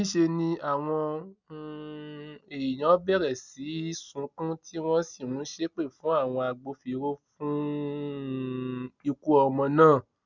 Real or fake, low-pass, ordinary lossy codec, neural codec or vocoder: real; 7.2 kHz; none; none